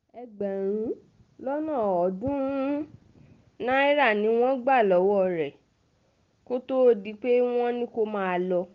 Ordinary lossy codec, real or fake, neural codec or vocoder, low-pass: Opus, 32 kbps; real; none; 7.2 kHz